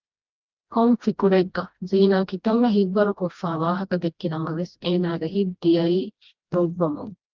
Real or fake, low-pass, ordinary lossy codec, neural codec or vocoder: fake; 7.2 kHz; Opus, 24 kbps; codec, 16 kHz, 1 kbps, FreqCodec, smaller model